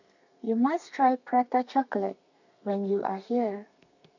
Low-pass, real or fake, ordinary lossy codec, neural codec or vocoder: 7.2 kHz; fake; none; codec, 32 kHz, 1.9 kbps, SNAC